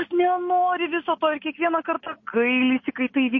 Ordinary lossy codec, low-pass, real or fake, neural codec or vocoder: MP3, 32 kbps; 7.2 kHz; real; none